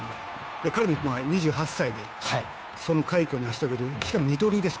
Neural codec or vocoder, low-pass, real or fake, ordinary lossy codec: codec, 16 kHz, 2 kbps, FunCodec, trained on Chinese and English, 25 frames a second; none; fake; none